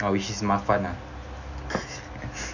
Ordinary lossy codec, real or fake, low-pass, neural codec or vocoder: none; real; 7.2 kHz; none